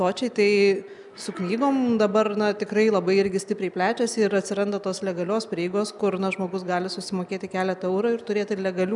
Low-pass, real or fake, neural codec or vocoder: 10.8 kHz; real; none